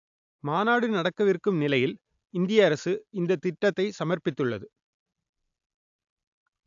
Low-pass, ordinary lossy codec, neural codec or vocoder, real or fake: 7.2 kHz; AAC, 64 kbps; none; real